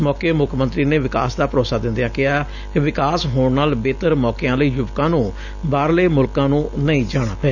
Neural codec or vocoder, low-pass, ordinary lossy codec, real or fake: none; 7.2 kHz; none; real